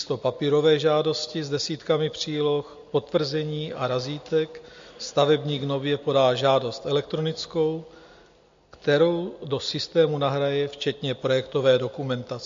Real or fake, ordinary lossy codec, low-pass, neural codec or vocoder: real; MP3, 48 kbps; 7.2 kHz; none